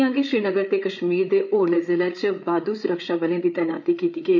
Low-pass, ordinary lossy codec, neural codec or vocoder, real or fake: 7.2 kHz; none; codec, 16 kHz, 8 kbps, FreqCodec, larger model; fake